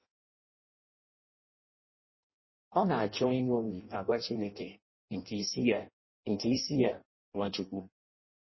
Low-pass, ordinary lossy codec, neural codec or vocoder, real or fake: 7.2 kHz; MP3, 24 kbps; codec, 16 kHz in and 24 kHz out, 0.6 kbps, FireRedTTS-2 codec; fake